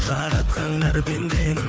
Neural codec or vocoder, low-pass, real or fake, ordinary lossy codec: codec, 16 kHz, 4 kbps, FunCodec, trained on Chinese and English, 50 frames a second; none; fake; none